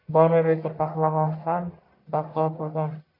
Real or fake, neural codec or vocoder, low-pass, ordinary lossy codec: fake; codec, 44.1 kHz, 1.7 kbps, Pupu-Codec; 5.4 kHz; AAC, 48 kbps